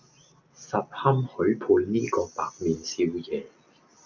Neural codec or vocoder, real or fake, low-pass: none; real; 7.2 kHz